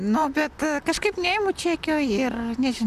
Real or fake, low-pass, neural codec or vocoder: fake; 14.4 kHz; vocoder, 44.1 kHz, 128 mel bands, Pupu-Vocoder